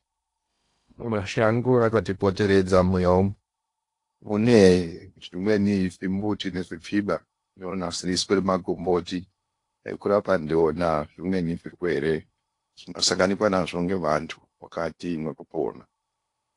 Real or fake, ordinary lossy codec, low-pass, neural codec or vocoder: fake; AAC, 48 kbps; 10.8 kHz; codec, 16 kHz in and 24 kHz out, 0.8 kbps, FocalCodec, streaming, 65536 codes